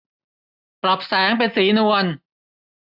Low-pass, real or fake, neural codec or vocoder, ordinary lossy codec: 5.4 kHz; real; none; none